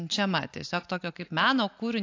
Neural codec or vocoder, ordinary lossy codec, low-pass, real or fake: none; AAC, 48 kbps; 7.2 kHz; real